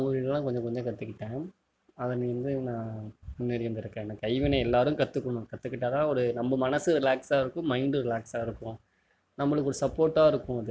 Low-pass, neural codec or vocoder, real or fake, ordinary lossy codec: none; none; real; none